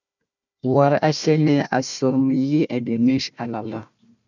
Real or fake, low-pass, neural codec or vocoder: fake; 7.2 kHz; codec, 16 kHz, 1 kbps, FunCodec, trained on Chinese and English, 50 frames a second